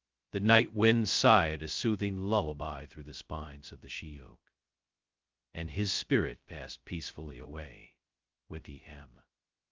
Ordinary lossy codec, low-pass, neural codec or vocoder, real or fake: Opus, 32 kbps; 7.2 kHz; codec, 16 kHz, 0.2 kbps, FocalCodec; fake